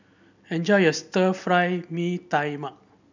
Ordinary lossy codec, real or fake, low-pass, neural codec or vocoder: none; real; 7.2 kHz; none